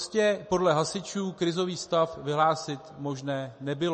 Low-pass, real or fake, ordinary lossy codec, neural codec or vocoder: 10.8 kHz; real; MP3, 32 kbps; none